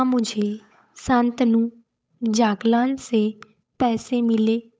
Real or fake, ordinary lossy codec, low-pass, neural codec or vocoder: fake; none; none; codec, 16 kHz, 8 kbps, FunCodec, trained on Chinese and English, 25 frames a second